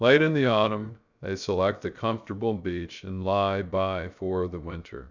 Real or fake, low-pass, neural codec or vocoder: fake; 7.2 kHz; codec, 16 kHz, 0.3 kbps, FocalCodec